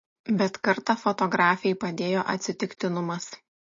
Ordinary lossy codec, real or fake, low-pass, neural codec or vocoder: MP3, 32 kbps; real; 7.2 kHz; none